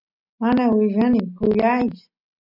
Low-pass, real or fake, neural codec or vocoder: 5.4 kHz; real; none